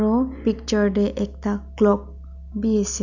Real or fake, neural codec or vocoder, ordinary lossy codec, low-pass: real; none; none; 7.2 kHz